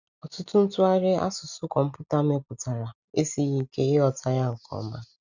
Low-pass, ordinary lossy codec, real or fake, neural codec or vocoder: 7.2 kHz; none; real; none